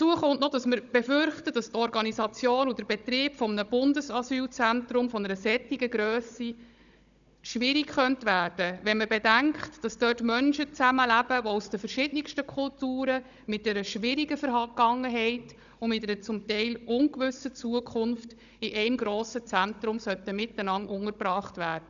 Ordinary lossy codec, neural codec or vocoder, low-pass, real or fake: none; codec, 16 kHz, 16 kbps, FunCodec, trained on Chinese and English, 50 frames a second; 7.2 kHz; fake